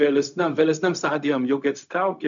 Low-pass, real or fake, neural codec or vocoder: 7.2 kHz; fake; codec, 16 kHz, 0.4 kbps, LongCat-Audio-Codec